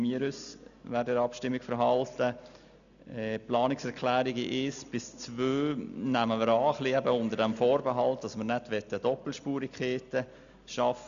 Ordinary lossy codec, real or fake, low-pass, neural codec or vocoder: none; real; 7.2 kHz; none